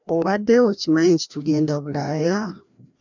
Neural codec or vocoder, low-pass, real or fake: codec, 16 kHz, 1 kbps, FreqCodec, larger model; 7.2 kHz; fake